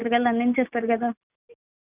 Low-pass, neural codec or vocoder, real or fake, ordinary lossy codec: 3.6 kHz; none; real; none